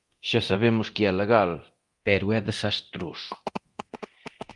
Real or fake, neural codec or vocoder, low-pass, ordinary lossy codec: fake; codec, 24 kHz, 0.9 kbps, DualCodec; 10.8 kHz; Opus, 32 kbps